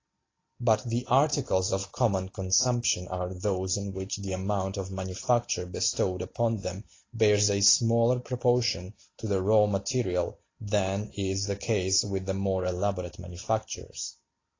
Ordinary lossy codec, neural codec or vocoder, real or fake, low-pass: AAC, 32 kbps; none; real; 7.2 kHz